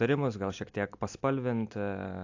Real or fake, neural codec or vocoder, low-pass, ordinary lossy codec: real; none; 7.2 kHz; AAC, 48 kbps